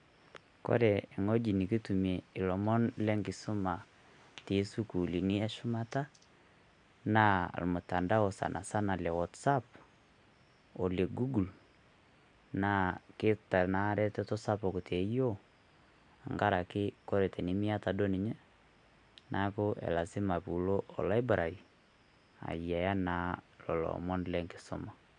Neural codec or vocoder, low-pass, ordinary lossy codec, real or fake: none; 9.9 kHz; none; real